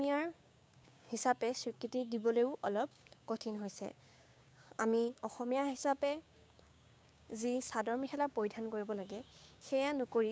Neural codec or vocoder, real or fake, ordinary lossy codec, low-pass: codec, 16 kHz, 6 kbps, DAC; fake; none; none